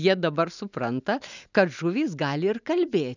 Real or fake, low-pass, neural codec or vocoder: real; 7.2 kHz; none